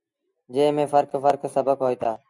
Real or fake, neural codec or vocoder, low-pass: real; none; 10.8 kHz